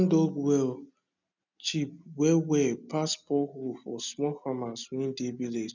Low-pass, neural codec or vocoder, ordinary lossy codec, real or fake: 7.2 kHz; none; none; real